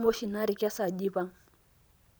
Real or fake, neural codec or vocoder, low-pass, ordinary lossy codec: fake; vocoder, 44.1 kHz, 128 mel bands every 512 samples, BigVGAN v2; none; none